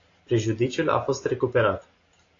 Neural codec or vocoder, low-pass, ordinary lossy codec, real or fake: none; 7.2 kHz; AAC, 32 kbps; real